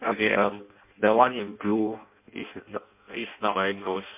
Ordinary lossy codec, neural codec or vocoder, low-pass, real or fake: MP3, 32 kbps; codec, 16 kHz in and 24 kHz out, 0.6 kbps, FireRedTTS-2 codec; 3.6 kHz; fake